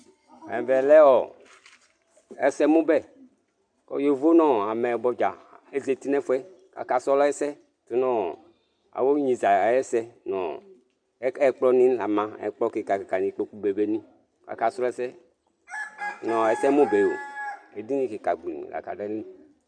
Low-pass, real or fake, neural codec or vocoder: 9.9 kHz; real; none